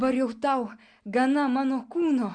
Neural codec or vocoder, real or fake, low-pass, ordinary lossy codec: none; real; 9.9 kHz; Opus, 64 kbps